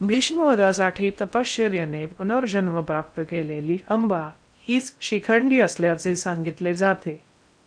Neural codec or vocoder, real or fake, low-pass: codec, 16 kHz in and 24 kHz out, 0.6 kbps, FocalCodec, streaming, 4096 codes; fake; 9.9 kHz